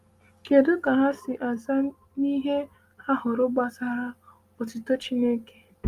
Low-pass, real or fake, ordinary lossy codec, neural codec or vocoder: 14.4 kHz; real; Opus, 32 kbps; none